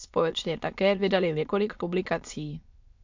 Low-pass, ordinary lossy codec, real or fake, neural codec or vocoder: 7.2 kHz; MP3, 64 kbps; fake; autoencoder, 22.05 kHz, a latent of 192 numbers a frame, VITS, trained on many speakers